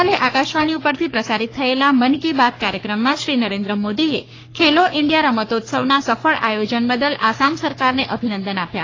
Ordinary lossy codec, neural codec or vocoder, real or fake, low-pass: AAC, 32 kbps; codec, 44.1 kHz, 3.4 kbps, Pupu-Codec; fake; 7.2 kHz